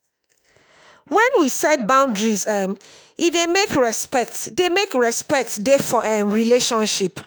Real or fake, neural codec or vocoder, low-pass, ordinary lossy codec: fake; autoencoder, 48 kHz, 32 numbers a frame, DAC-VAE, trained on Japanese speech; none; none